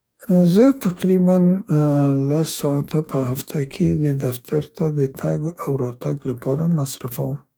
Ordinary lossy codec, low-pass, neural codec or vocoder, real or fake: none; none; codec, 44.1 kHz, 2.6 kbps, DAC; fake